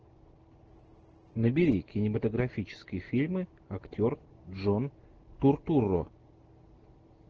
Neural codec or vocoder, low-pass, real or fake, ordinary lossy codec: none; 7.2 kHz; real; Opus, 16 kbps